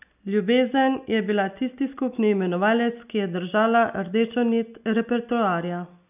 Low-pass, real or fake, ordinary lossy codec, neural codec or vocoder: 3.6 kHz; real; none; none